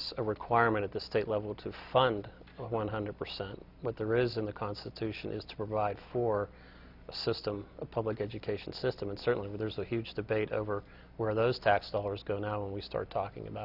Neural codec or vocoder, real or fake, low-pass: none; real; 5.4 kHz